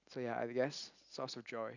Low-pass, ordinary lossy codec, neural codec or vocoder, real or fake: 7.2 kHz; none; none; real